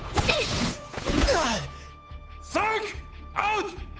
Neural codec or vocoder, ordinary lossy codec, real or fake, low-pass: codec, 16 kHz, 8 kbps, FunCodec, trained on Chinese and English, 25 frames a second; none; fake; none